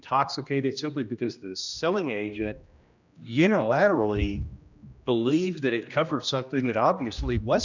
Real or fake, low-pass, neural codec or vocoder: fake; 7.2 kHz; codec, 16 kHz, 1 kbps, X-Codec, HuBERT features, trained on general audio